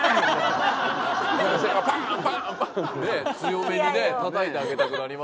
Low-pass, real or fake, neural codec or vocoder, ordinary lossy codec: none; real; none; none